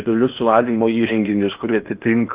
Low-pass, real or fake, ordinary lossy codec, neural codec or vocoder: 3.6 kHz; fake; Opus, 32 kbps; codec, 16 kHz in and 24 kHz out, 0.8 kbps, FocalCodec, streaming, 65536 codes